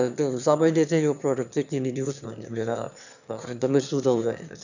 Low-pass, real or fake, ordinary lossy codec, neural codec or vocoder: 7.2 kHz; fake; none; autoencoder, 22.05 kHz, a latent of 192 numbers a frame, VITS, trained on one speaker